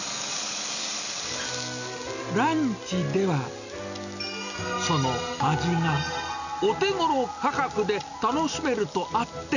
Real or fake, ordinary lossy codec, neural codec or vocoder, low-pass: real; none; none; 7.2 kHz